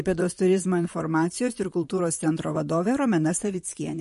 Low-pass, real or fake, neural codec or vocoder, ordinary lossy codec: 14.4 kHz; fake; vocoder, 44.1 kHz, 128 mel bands, Pupu-Vocoder; MP3, 48 kbps